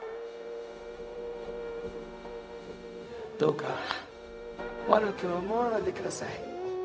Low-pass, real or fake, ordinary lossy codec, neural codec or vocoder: none; fake; none; codec, 16 kHz, 0.4 kbps, LongCat-Audio-Codec